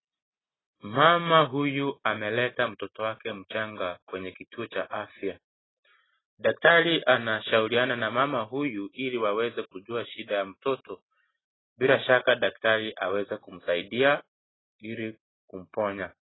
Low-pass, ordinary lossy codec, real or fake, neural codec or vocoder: 7.2 kHz; AAC, 16 kbps; real; none